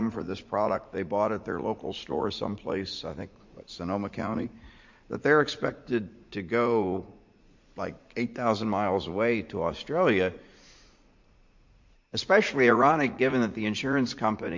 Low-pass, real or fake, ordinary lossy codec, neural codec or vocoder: 7.2 kHz; fake; MP3, 48 kbps; vocoder, 44.1 kHz, 80 mel bands, Vocos